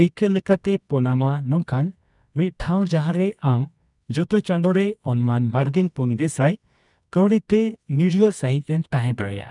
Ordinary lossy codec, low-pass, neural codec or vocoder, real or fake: none; 10.8 kHz; codec, 24 kHz, 0.9 kbps, WavTokenizer, medium music audio release; fake